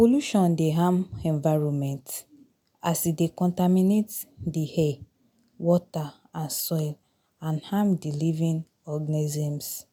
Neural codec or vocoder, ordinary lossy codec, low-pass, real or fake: none; none; none; real